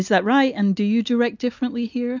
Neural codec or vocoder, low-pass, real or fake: none; 7.2 kHz; real